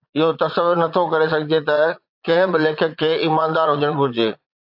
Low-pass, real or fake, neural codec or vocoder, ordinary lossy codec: 5.4 kHz; fake; vocoder, 22.05 kHz, 80 mel bands, Vocos; AAC, 48 kbps